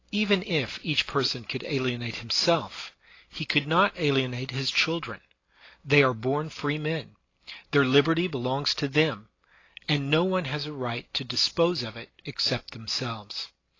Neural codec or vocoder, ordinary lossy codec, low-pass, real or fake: none; AAC, 32 kbps; 7.2 kHz; real